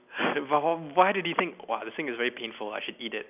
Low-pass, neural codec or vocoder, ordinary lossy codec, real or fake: 3.6 kHz; none; none; real